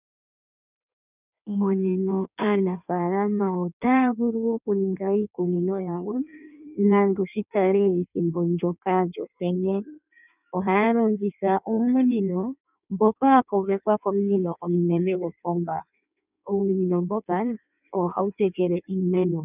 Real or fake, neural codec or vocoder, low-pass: fake; codec, 16 kHz in and 24 kHz out, 1.1 kbps, FireRedTTS-2 codec; 3.6 kHz